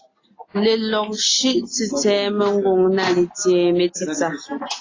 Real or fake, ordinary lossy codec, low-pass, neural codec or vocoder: real; AAC, 32 kbps; 7.2 kHz; none